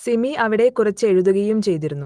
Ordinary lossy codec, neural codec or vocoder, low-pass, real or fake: Opus, 24 kbps; none; 9.9 kHz; real